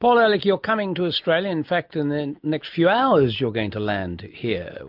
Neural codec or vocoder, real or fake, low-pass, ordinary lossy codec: none; real; 5.4 kHz; MP3, 48 kbps